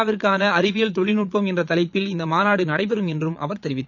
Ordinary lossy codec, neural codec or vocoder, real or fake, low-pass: none; vocoder, 22.05 kHz, 80 mel bands, Vocos; fake; 7.2 kHz